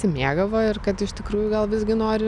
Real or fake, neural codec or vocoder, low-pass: real; none; 10.8 kHz